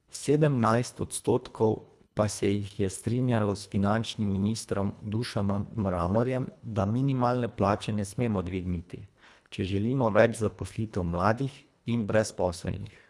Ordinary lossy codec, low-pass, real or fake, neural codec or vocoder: none; none; fake; codec, 24 kHz, 1.5 kbps, HILCodec